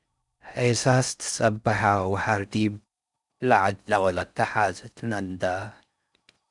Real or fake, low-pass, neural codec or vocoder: fake; 10.8 kHz; codec, 16 kHz in and 24 kHz out, 0.6 kbps, FocalCodec, streaming, 4096 codes